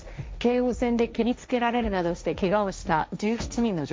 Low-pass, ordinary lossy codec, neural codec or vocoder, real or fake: none; none; codec, 16 kHz, 1.1 kbps, Voila-Tokenizer; fake